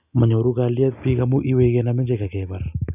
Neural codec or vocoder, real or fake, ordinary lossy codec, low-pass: none; real; none; 3.6 kHz